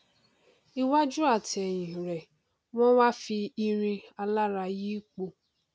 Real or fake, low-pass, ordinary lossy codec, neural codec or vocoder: real; none; none; none